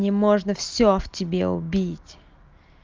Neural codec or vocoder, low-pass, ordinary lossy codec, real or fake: none; 7.2 kHz; Opus, 32 kbps; real